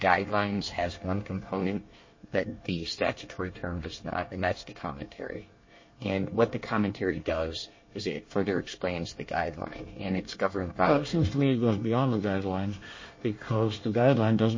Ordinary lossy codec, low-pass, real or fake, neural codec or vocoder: MP3, 32 kbps; 7.2 kHz; fake; codec, 24 kHz, 1 kbps, SNAC